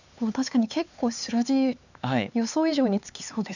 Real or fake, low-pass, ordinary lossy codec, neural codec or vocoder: fake; 7.2 kHz; none; codec, 16 kHz, 4 kbps, X-Codec, HuBERT features, trained on LibriSpeech